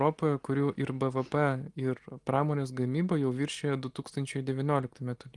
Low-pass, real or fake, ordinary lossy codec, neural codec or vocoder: 10.8 kHz; real; Opus, 24 kbps; none